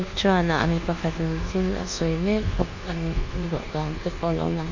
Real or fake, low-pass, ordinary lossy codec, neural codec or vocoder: fake; 7.2 kHz; Opus, 64 kbps; codec, 24 kHz, 1.2 kbps, DualCodec